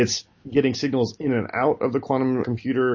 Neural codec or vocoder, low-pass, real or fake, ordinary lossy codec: none; 7.2 kHz; real; MP3, 32 kbps